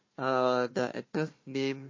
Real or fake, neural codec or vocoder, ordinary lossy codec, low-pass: fake; codec, 16 kHz, 1 kbps, FunCodec, trained on Chinese and English, 50 frames a second; MP3, 32 kbps; 7.2 kHz